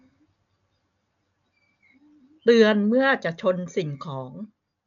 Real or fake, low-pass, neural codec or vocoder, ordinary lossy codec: real; 7.2 kHz; none; none